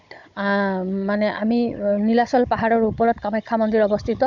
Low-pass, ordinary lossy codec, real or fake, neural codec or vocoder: 7.2 kHz; MP3, 64 kbps; fake; codec, 16 kHz, 16 kbps, FunCodec, trained on Chinese and English, 50 frames a second